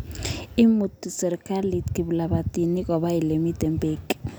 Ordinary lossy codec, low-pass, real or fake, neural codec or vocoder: none; none; real; none